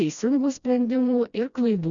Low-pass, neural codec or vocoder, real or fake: 7.2 kHz; codec, 16 kHz, 1 kbps, FreqCodec, smaller model; fake